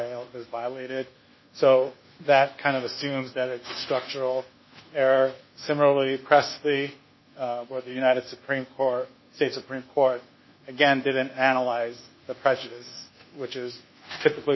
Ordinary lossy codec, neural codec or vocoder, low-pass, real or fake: MP3, 24 kbps; codec, 24 kHz, 1.2 kbps, DualCodec; 7.2 kHz; fake